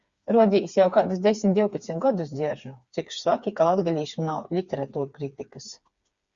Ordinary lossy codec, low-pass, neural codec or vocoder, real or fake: Opus, 64 kbps; 7.2 kHz; codec, 16 kHz, 4 kbps, FreqCodec, smaller model; fake